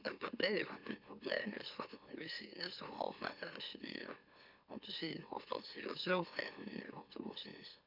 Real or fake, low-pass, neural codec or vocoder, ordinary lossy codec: fake; 5.4 kHz; autoencoder, 44.1 kHz, a latent of 192 numbers a frame, MeloTTS; none